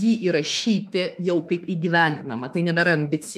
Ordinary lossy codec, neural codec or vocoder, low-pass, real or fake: AAC, 96 kbps; autoencoder, 48 kHz, 32 numbers a frame, DAC-VAE, trained on Japanese speech; 14.4 kHz; fake